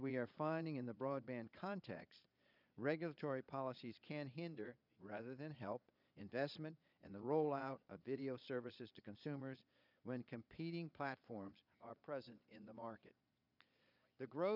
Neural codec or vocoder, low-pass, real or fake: vocoder, 22.05 kHz, 80 mel bands, Vocos; 5.4 kHz; fake